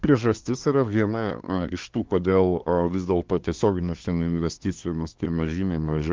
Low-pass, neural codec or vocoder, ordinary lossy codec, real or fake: 7.2 kHz; codec, 24 kHz, 1 kbps, SNAC; Opus, 32 kbps; fake